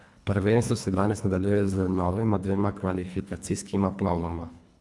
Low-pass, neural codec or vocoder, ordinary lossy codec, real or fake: none; codec, 24 kHz, 1.5 kbps, HILCodec; none; fake